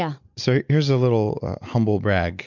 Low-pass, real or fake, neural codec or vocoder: 7.2 kHz; real; none